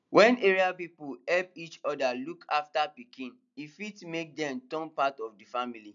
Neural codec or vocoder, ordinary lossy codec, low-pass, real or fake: none; none; 7.2 kHz; real